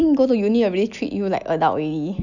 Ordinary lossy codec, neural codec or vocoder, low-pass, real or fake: none; none; 7.2 kHz; real